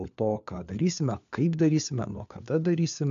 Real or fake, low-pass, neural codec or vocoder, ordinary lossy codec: fake; 7.2 kHz; codec, 16 kHz, 4 kbps, FunCodec, trained on LibriTTS, 50 frames a second; AAC, 64 kbps